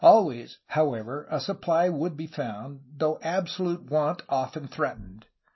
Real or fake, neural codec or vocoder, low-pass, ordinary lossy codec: real; none; 7.2 kHz; MP3, 24 kbps